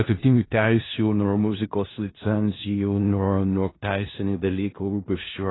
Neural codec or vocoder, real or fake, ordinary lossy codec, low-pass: codec, 16 kHz in and 24 kHz out, 0.4 kbps, LongCat-Audio-Codec, four codebook decoder; fake; AAC, 16 kbps; 7.2 kHz